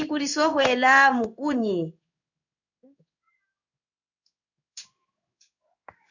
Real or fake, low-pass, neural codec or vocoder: fake; 7.2 kHz; codec, 16 kHz in and 24 kHz out, 1 kbps, XY-Tokenizer